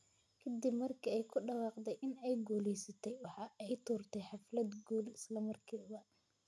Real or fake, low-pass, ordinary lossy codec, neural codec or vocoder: real; none; none; none